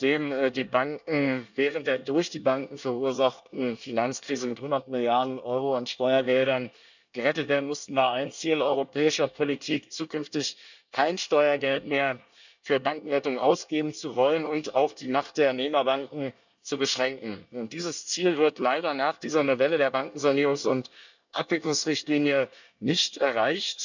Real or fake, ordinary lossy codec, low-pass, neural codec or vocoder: fake; none; 7.2 kHz; codec, 24 kHz, 1 kbps, SNAC